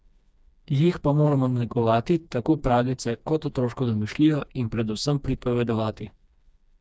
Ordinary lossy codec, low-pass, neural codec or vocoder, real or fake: none; none; codec, 16 kHz, 2 kbps, FreqCodec, smaller model; fake